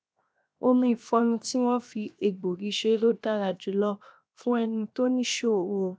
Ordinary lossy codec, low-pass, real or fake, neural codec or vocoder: none; none; fake; codec, 16 kHz, 0.7 kbps, FocalCodec